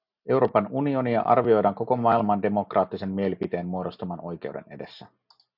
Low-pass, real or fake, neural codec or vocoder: 5.4 kHz; real; none